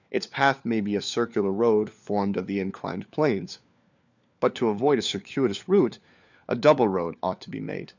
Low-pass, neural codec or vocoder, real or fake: 7.2 kHz; codec, 16 kHz, 4 kbps, FunCodec, trained on LibriTTS, 50 frames a second; fake